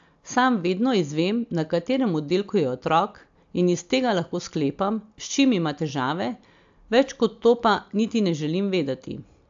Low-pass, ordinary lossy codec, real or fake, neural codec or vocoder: 7.2 kHz; AAC, 64 kbps; real; none